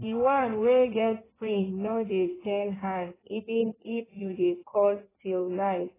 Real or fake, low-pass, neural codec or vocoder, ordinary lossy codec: fake; 3.6 kHz; codec, 16 kHz in and 24 kHz out, 1.1 kbps, FireRedTTS-2 codec; AAC, 16 kbps